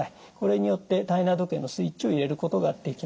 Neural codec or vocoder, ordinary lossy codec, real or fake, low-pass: none; none; real; none